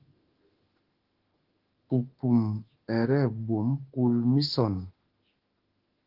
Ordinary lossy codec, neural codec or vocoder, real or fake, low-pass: Opus, 16 kbps; autoencoder, 48 kHz, 32 numbers a frame, DAC-VAE, trained on Japanese speech; fake; 5.4 kHz